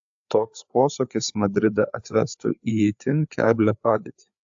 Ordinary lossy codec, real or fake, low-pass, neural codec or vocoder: AAC, 64 kbps; fake; 7.2 kHz; codec, 16 kHz, 4 kbps, FreqCodec, larger model